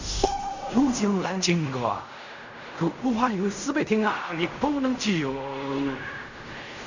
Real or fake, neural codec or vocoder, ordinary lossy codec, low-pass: fake; codec, 16 kHz in and 24 kHz out, 0.4 kbps, LongCat-Audio-Codec, fine tuned four codebook decoder; none; 7.2 kHz